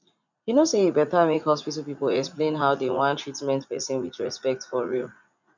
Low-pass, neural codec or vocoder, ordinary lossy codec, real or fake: 7.2 kHz; vocoder, 44.1 kHz, 80 mel bands, Vocos; none; fake